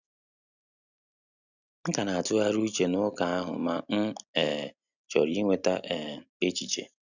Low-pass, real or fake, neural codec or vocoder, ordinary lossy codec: 7.2 kHz; real; none; none